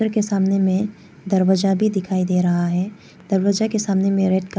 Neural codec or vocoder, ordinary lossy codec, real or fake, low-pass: none; none; real; none